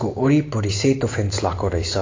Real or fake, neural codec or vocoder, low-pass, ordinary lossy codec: real; none; 7.2 kHz; AAC, 32 kbps